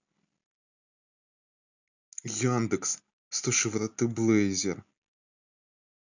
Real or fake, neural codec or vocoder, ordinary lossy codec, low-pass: real; none; none; 7.2 kHz